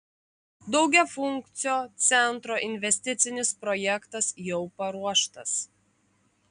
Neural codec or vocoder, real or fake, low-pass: none; real; 9.9 kHz